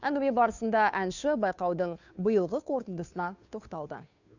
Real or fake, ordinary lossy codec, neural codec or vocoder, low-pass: fake; none; codec, 16 kHz, 2 kbps, FunCodec, trained on Chinese and English, 25 frames a second; 7.2 kHz